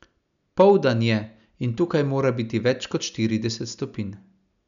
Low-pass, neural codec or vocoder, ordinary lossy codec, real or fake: 7.2 kHz; none; none; real